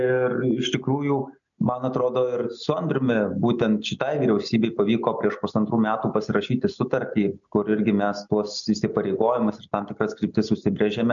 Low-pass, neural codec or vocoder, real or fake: 7.2 kHz; none; real